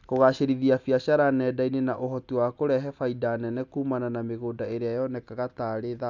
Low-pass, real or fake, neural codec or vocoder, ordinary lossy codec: 7.2 kHz; real; none; none